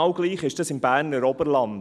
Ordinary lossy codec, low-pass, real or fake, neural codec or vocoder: none; none; real; none